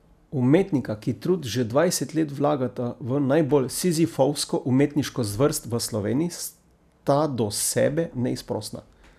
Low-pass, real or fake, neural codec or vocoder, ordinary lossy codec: 14.4 kHz; real; none; none